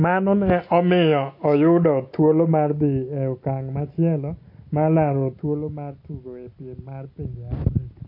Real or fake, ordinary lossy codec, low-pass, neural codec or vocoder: real; MP3, 32 kbps; 5.4 kHz; none